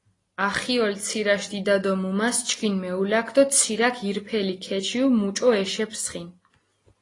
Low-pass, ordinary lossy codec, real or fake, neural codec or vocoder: 10.8 kHz; AAC, 32 kbps; real; none